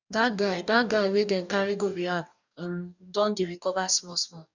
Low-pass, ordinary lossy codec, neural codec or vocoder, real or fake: 7.2 kHz; none; codec, 44.1 kHz, 2.6 kbps, DAC; fake